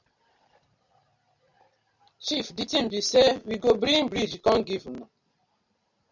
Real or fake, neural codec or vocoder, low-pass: real; none; 7.2 kHz